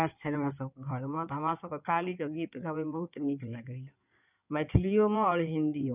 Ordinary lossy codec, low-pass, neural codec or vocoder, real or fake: none; 3.6 kHz; codec, 16 kHz in and 24 kHz out, 2.2 kbps, FireRedTTS-2 codec; fake